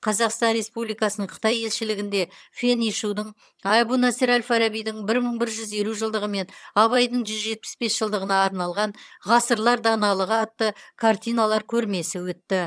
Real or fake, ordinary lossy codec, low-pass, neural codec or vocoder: fake; none; none; vocoder, 22.05 kHz, 80 mel bands, HiFi-GAN